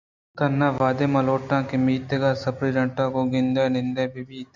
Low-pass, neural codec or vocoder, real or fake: 7.2 kHz; none; real